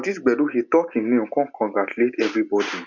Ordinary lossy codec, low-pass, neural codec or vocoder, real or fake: none; 7.2 kHz; none; real